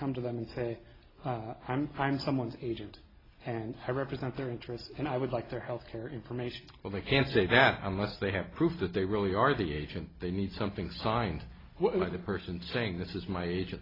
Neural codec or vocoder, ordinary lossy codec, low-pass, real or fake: none; AAC, 24 kbps; 5.4 kHz; real